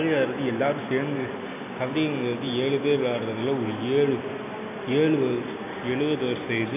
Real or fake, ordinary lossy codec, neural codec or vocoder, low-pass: real; AAC, 24 kbps; none; 3.6 kHz